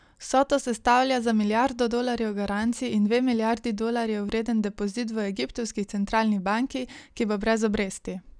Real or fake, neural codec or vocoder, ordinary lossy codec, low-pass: real; none; none; 9.9 kHz